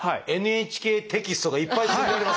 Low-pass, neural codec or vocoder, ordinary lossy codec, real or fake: none; none; none; real